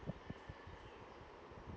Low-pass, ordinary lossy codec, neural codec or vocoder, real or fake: none; none; none; real